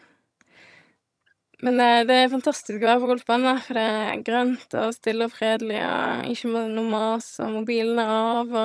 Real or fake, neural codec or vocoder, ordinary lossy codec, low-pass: fake; vocoder, 22.05 kHz, 80 mel bands, HiFi-GAN; none; none